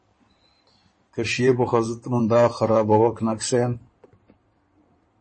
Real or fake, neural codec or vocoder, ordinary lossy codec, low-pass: fake; codec, 16 kHz in and 24 kHz out, 2.2 kbps, FireRedTTS-2 codec; MP3, 32 kbps; 9.9 kHz